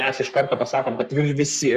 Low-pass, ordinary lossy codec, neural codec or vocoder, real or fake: 14.4 kHz; Opus, 64 kbps; codec, 44.1 kHz, 3.4 kbps, Pupu-Codec; fake